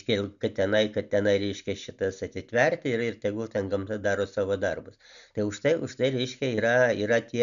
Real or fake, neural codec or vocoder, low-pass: real; none; 7.2 kHz